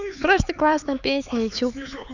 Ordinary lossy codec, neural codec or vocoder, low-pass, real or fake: none; codec, 16 kHz, 4 kbps, X-Codec, HuBERT features, trained on LibriSpeech; 7.2 kHz; fake